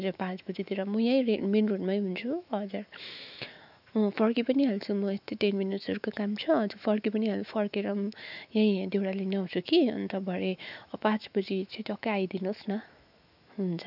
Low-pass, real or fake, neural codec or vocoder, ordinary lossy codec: 5.4 kHz; fake; autoencoder, 48 kHz, 128 numbers a frame, DAC-VAE, trained on Japanese speech; none